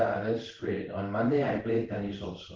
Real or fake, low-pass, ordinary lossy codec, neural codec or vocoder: fake; 7.2 kHz; Opus, 16 kbps; codec, 16 kHz in and 24 kHz out, 1 kbps, XY-Tokenizer